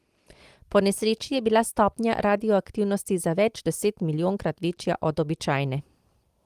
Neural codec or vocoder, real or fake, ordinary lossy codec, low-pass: none; real; Opus, 24 kbps; 14.4 kHz